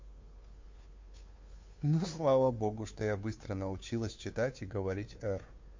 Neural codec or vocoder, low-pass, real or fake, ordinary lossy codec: codec, 16 kHz, 2 kbps, FunCodec, trained on Chinese and English, 25 frames a second; 7.2 kHz; fake; MP3, 48 kbps